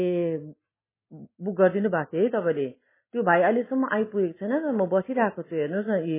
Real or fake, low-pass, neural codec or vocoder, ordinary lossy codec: real; 3.6 kHz; none; MP3, 16 kbps